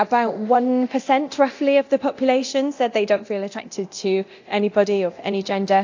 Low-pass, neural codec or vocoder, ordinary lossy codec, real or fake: 7.2 kHz; codec, 24 kHz, 0.9 kbps, DualCodec; AAC, 48 kbps; fake